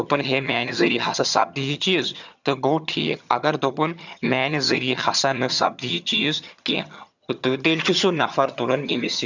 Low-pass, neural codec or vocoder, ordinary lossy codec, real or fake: 7.2 kHz; vocoder, 22.05 kHz, 80 mel bands, HiFi-GAN; none; fake